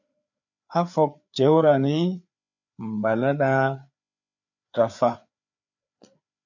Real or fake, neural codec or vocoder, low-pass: fake; codec, 16 kHz, 4 kbps, FreqCodec, larger model; 7.2 kHz